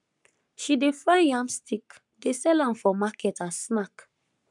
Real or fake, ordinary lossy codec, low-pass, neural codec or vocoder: fake; none; 10.8 kHz; codec, 44.1 kHz, 7.8 kbps, Pupu-Codec